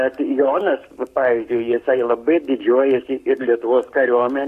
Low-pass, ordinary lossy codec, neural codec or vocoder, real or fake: 14.4 kHz; AAC, 64 kbps; codec, 44.1 kHz, 7.8 kbps, Pupu-Codec; fake